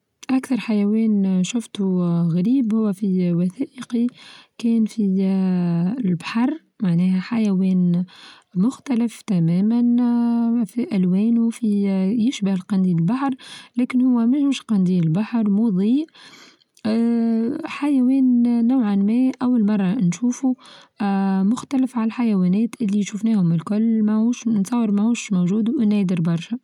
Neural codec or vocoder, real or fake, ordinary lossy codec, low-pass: none; real; none; 19.8 kHz